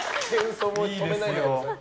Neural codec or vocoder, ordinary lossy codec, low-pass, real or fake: none; none; none; real